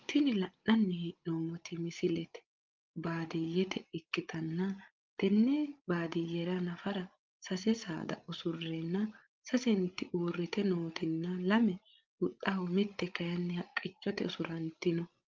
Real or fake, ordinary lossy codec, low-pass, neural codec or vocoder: fake; Opus, 24 kbps; 7.2 kHz; codec, 16 kHz, 16 kbps, FreqCodec, larger model